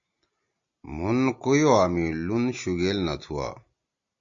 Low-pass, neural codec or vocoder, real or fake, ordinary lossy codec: 7.2 kHz; none; real; AAC, 64 kbps